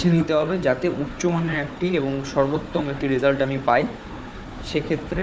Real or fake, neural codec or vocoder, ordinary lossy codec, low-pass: fake; codec, 16 kHz, 4 kbps, FunCodec, trained on Chinese and English, 50 frames a second; none; none